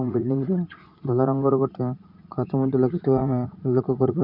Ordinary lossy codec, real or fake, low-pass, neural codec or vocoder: none; fake; 5.4 kHz; vocoder, 44.1 kHz, 80 mel bands, Vocos